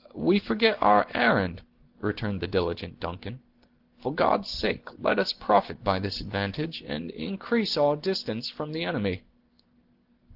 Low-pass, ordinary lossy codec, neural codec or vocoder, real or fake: 5.4 kHz; Opus, 16 kbps; none; real